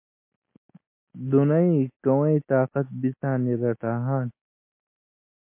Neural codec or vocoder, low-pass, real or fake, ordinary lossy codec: none; 3.6 kHz; real; MP3, 24 kbps